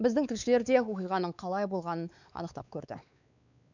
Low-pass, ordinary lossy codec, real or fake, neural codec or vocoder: 7.2 kHz; none; fake; codec, 16 kHz, 4 kbps, X-Codec, WavLM features, trained on Multilingual LibriSpeech